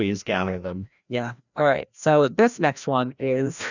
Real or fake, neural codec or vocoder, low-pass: fake; codec, 16 kHz, 1 kbps, FreqCodec, larger model; 7.2 kHz